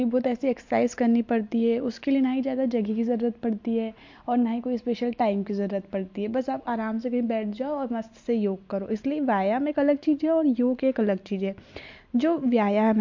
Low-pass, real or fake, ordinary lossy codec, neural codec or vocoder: 7.2 kHz; real; MP3, 48 kbps; none